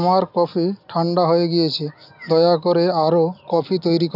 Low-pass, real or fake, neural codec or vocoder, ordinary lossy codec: 5.4 kHz; real; none; none